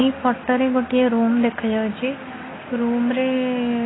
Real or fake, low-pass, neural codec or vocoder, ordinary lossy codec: real; 7.2 kHz; none; AAC, 16 kbps